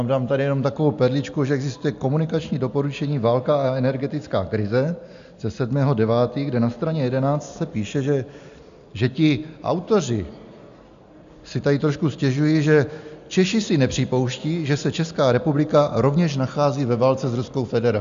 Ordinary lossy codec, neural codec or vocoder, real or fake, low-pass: MP3, 64 kbps; none; real; 7.2 kHz